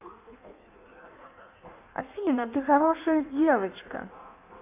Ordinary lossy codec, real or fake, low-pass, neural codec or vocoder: none; fake; 3.6 kHz; codec, 16 kHz in and 24 kHz out, 1.1 kbps, FireRedTTS-2 codec